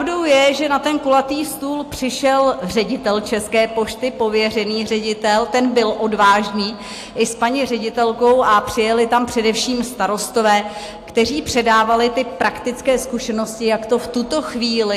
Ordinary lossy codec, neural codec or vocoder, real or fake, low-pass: AAC, 64 kbps; none; real; 14.4 kHz